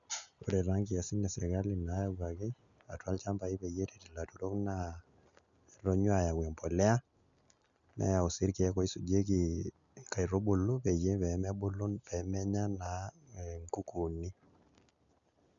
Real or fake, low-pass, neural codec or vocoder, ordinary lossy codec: real; 7.2 kHz; none; none